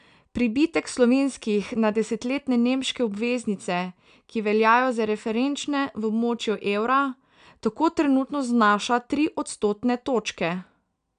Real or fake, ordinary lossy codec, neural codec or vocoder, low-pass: real; none; none; 9.9 kHz